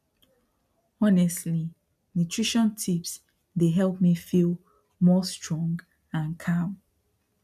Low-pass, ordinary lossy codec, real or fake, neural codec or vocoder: 14.4 kHz; none; real; none